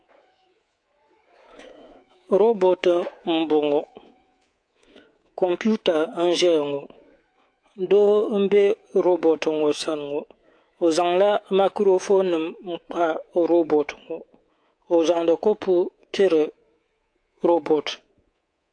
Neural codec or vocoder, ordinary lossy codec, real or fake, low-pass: codec, 24 kHz, 3.1 kbps, DualCodec; AAC, 48 kbps; fake; 9.9 kHz